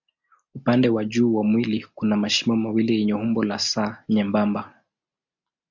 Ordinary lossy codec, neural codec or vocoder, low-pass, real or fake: MP3, 64 kbps; none; 7.2 kHz; real